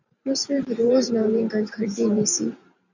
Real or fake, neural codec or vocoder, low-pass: fake; vocoder, 44.1 kHz, 128 mel bands every 512 samples, BigVGAN v2; 7.2 kHz